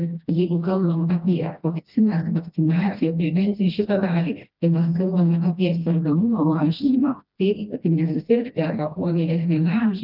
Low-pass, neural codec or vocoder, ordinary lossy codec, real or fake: 5.4 kHz; codec, 16 kHz, 1 kbps, FreqCodec, smaller model; Opus, 24 kbps; fake